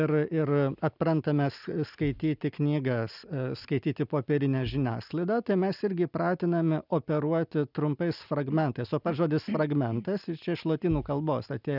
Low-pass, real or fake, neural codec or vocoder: 5.4 kHz; real; none